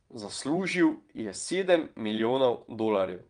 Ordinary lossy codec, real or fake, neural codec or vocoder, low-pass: Opus, 32 kbps; fake; vocoder, 22.05 kHz, 80 mel bands, WaveNeXt; 9.9 kHz